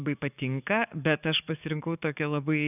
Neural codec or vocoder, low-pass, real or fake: codec, 44.1 kHz, 7.8 kbps, DAC; 3.6 kHz; fake